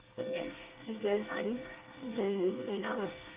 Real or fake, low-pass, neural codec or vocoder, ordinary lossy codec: fake; 3.6 kHz; codec, 24 kHz, 1 kbps, SNAC; Opus, 32 kbps